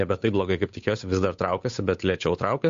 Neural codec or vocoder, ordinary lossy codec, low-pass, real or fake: codec, 16 kHz, 8 kbps, FunCodec, trained on Chinese and English, 25 frames a second; MP3, 48 kbps; 7.2 kHz; fake